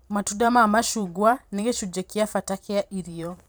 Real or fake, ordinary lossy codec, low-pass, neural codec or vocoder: fake; none; none; vocoder, 44.1 kHz, 128 mel bands every 512 samples, BigVGAN v2